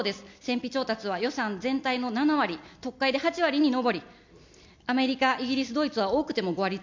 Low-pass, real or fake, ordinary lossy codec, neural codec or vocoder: 7.2 kHz; real; MP3, 64 kbps; none